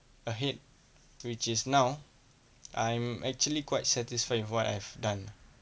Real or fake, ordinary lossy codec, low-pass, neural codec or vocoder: real; none; none; none